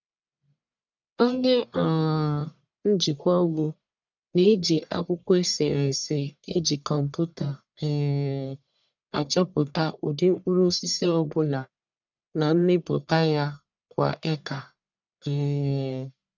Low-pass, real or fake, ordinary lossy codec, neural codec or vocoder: 7.2 kHz; fake; none; codec, 44.1 kHz, 1.7 kbps, Pupu-Codec